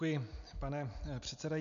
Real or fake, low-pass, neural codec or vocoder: real; 7.2 kHz; none